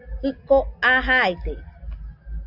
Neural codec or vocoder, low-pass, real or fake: none; 5.4 kHz; real